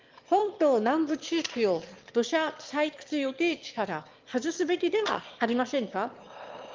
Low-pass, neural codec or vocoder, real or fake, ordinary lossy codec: 7.2 kHz; autoencoder, 22.05 kHz, a latent of 192 numbers a frame, VITS, trained on one speaker; fake; Opus, 24 kbps